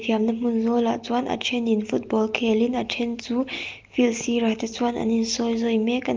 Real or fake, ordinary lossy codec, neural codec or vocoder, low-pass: real; Opus, 32 kbps; none; 7.2 kHz